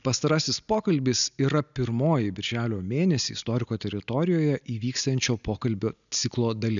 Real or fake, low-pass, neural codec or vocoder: real; 7.2 kHz; none